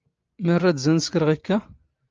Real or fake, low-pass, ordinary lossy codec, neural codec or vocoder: real; 7.2 kHz; Opus, 24 kbps; none